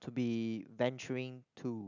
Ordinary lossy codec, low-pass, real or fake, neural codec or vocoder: none; 7.2 kHz; real; none